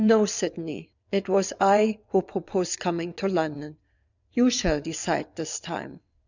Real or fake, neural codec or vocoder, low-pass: fake; vocoder, 22.05 kHz, 80 mel bands, WaveNeXt; 7.2 kHz